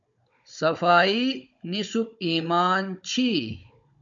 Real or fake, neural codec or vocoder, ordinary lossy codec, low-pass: fake; codec, 16 kHz, 16 kbps, FunCodec, trained on Chinese and English, 50 frames a second; MP3, 64 kbps; 7.2 kHz